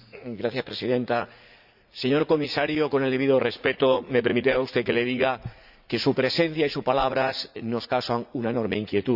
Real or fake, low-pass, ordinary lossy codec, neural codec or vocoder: fake; 5.4 kHz; Opus, 64 kbps; vocoder, 44.1 kHz, 80 mel bands, Vocos